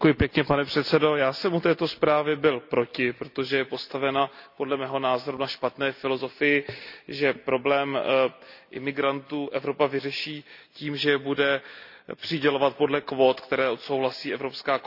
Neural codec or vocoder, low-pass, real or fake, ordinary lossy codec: none; 5.4 kHz; real; none